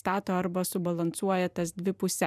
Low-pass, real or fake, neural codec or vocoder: 14.4 kHz; fake; vocoder, 44.1 kHz, 128 mel bands every 512 samples, BigVGAN v2